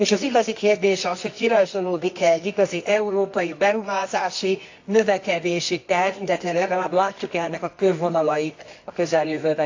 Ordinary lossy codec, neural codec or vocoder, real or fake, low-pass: AAC, 48 kbps; codec, 24 kHz, 0.9 kbps, WavTokenizer, medium music audio release; fake; 7.2 kHz